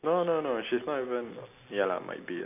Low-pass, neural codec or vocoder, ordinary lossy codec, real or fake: 3.6 kHz; none; none; real